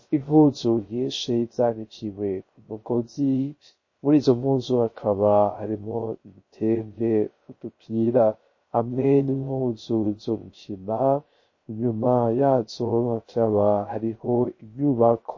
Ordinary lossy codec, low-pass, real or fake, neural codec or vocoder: MP3, 32 kbps; 7.2 kHz; fake; codec, 16 kHz, 0.3 kbps, FocalCodec